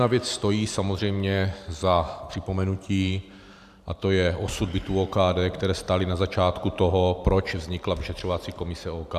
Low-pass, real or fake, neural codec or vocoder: 14.4 kHz; fake; vocoder, 44.1 kHz, 128 mel bands every 512 samples, BigVGAN v2